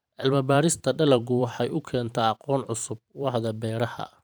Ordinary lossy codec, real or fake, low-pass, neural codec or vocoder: none; fake; none; vocoder, 44.1 kHz, 128 mel bands every 512 samples, BigVGAN v2